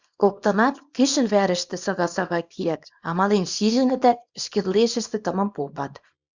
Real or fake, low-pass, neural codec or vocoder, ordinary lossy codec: fake; 7.2 kHz; codec, 24 kHz, 0.9 kbps, WavTokenizer, small release; Opus, 64 kbps